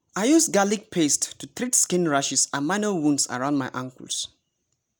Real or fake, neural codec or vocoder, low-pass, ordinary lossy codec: real; none; none; none